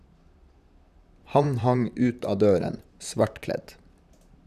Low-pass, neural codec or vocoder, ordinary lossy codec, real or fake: 14.4 kHz; vocoder, 44.1 kHz, 128 mel bands, Pupu-Vocoder; none; fake